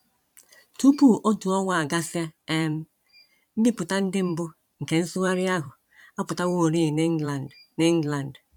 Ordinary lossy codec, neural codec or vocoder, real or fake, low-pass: none; vocoder, 44.1 kHz, 128 mel bands every 512 samples, BigVGAN v2; fake; 19.8 kHz